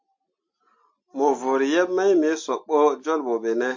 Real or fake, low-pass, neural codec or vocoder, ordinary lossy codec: real; 7.2 kHz; none; MP3, 48 kbps